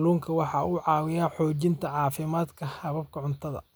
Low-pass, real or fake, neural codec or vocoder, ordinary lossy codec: none; real; none; none